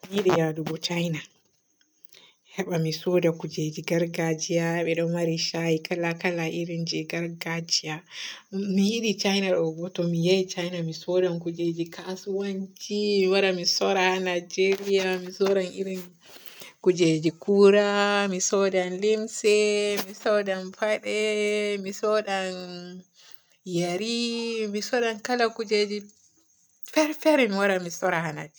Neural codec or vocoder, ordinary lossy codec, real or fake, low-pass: none; none; real; none